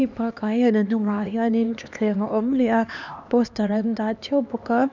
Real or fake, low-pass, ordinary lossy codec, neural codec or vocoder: fake; 7.2 kHz; none; codec, 16 kHz, 2 kbps, X-Codec, HuBERT features, trained on LibriSpeech